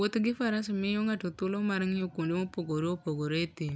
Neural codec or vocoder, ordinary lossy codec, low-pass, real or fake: none; none; none; real